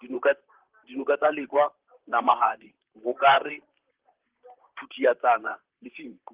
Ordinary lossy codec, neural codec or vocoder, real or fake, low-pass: Opus, 16 kbps; vocoder, 44.1 kHz, 128 mel bands, Pupu-Vocoder; fake; 3.6 kHz